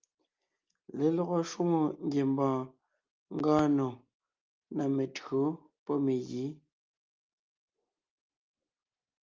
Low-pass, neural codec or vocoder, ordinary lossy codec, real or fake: 7.2 kHz; none; Opus, 24 kbps; real